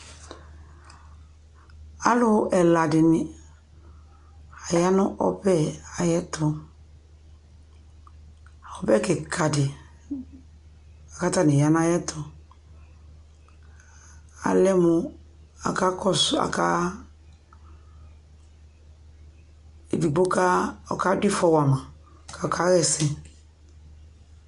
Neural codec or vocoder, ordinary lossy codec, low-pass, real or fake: none; MP3, 64 kbps; 10.8 kHz; real